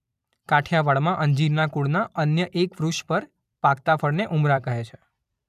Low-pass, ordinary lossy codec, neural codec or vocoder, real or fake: 14.4 kHz; none; vocoder, 44.1 kHz, 128 mel bands every 256 samples, BigVGAN v2; fake